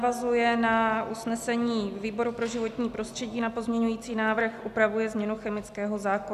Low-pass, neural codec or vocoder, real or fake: 14.4 kHz; none; real